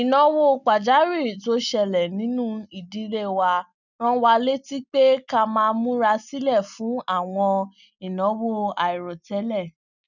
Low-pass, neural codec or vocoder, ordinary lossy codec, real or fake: 7.2 kHz; none; none; real